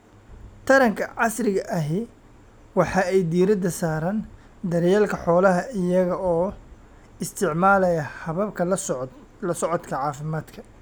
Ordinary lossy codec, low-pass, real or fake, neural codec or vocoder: none; none; real; none